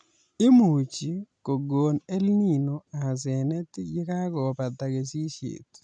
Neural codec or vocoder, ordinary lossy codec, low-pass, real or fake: none; none; 9.9 kHz; real